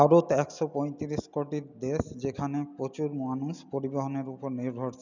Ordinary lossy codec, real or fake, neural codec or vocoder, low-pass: none; real; none; 7.2 kHz